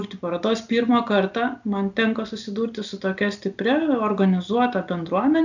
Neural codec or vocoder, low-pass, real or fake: none; 7.2 kHz; real